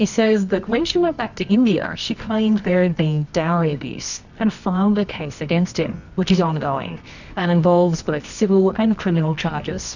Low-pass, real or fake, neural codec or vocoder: 7.2 kHz; fake; codec, 24 kHz, 0.9 kbps, WavTokenizer, medium music audio release